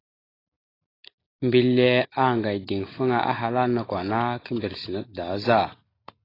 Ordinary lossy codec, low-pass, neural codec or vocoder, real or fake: AAC, 24 kbps; 5.4 kHz; none; real